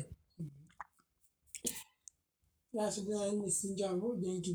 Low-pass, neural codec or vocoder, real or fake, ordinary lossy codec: none; codec, 44.1 kHz, 7.8 kbps, Pupu-Codec; fake; none